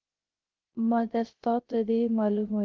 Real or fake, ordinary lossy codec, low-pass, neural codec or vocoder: fake; Opus, 16 kbps; 7.2 kHz; codec, 16 kHz, 0.3 kbps, FocalCodec